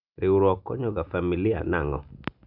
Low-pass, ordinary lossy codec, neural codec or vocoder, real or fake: 5.4 kHz; none; none; real